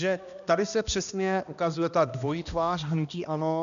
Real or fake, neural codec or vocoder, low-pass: fake; codec, 16 kHz, 1 kbps, X-Codec, HuBERT features, trained on balanced general audio; 7.2 kHz